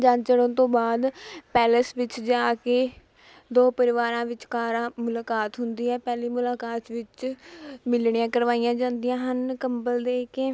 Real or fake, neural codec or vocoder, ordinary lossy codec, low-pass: real; none; none; none